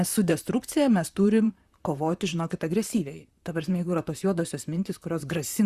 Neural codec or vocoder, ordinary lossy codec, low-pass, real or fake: vocoder, 44.1 kHz, 128 mel bands, Pupu-Vocoder; Opus, 64 kbps; 14.4 kHz; fake